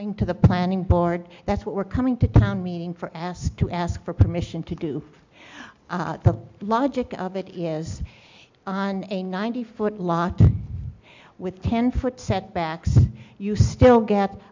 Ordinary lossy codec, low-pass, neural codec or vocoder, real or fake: MP3, 64 kbps; 7.2 kHz; none; real